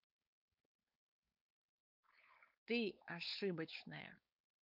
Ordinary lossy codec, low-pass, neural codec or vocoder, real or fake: MP3, 48 kbps; 5.4 kHz; codec, 16 kHz, 4.8 kbps, FACodec; fake